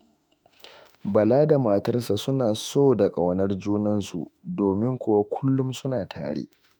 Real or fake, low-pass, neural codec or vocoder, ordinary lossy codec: fake; none; autoencoder, 48 kHz, 32 numbers a frame, DAC-VAE, trained on Japanese speech; none